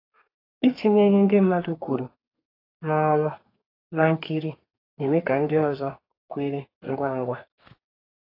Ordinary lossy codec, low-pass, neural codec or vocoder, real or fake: AAC, 32 kbps; 5.4 kHz; codec, 32 kHz, 1.9 kbps, SNAC; fake